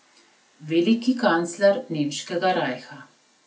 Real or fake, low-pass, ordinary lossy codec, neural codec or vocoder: real; none; none; none